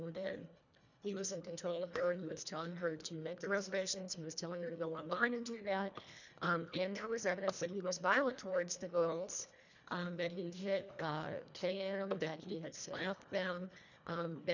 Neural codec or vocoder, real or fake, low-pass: codec, 24 kHz, 1.5 kbps, HILCodec; fake; 7.2 kHz